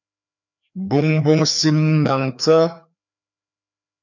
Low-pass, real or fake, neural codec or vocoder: 7.2 kHz; fake; codec, 16 kHz, 2 kbps, FreqCodec, larger model